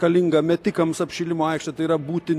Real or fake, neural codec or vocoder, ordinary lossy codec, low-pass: real; none; AAC, 64 kbps; 14.4 kHz